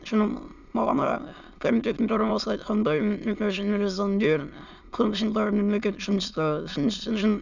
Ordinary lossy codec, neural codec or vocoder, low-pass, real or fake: none; autoencoder, 22.05 kHz, a latent of 192 numbers a frame, VITS, trained on many speakers; 7.2 kHz; fake